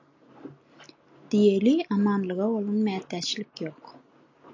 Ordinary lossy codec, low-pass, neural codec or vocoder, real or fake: AAC, 48 kbps; 7.2 kHz; none; real